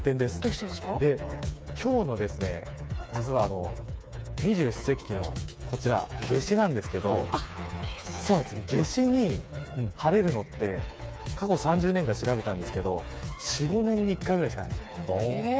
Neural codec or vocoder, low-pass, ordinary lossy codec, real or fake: codec, 16 kHz, 4 kbps, FreqCodec, smaller model; none; none; fake